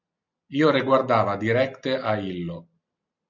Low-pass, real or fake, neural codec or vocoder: 7.2 kHz; real; none